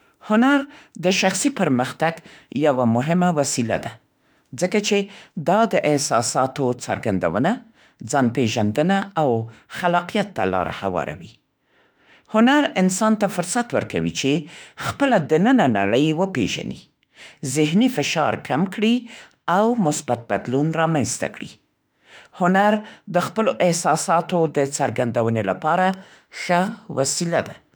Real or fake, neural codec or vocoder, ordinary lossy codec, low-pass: fake; autoencoder, 48 kHz, 32 numbers a frame, DAC-VAE, trained on Japanese speech; none; none